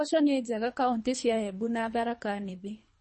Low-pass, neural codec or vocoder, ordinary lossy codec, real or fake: 10.8 kHz; codec, 24 kHz, 3 kbps, HILCodec; MP3, 32 kbps; fake